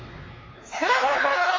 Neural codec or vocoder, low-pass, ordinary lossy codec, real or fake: codec, 16 kHz, 2 kbps, X-Codec, WavLM features, trained on Multilingual LibriSpeech; 7.2 kHz; MP3, 32 kbps; fake